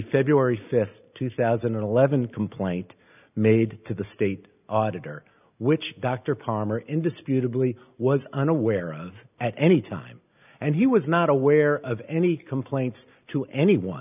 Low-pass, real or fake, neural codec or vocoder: 3.6 kHz; real; none